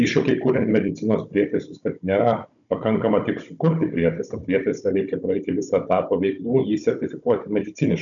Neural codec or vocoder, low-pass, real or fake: codec, 16 kHz, 16 kbps, FunCodec, trained on Chinese and English, 50 frames a second; 7.2 kHz; fake